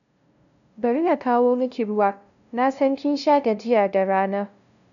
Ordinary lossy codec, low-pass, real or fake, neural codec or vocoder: none; 7.2 kHz; fake; codec, 16 kHz, 0.5 kbps, FunCodec, trained on LibriTTS, 25 frames a second